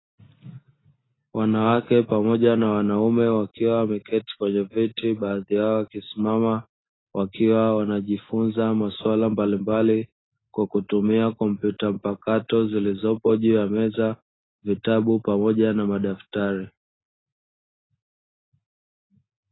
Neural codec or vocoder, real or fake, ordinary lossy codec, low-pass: none; real; AAC, 16 kbps; 7.2 kHz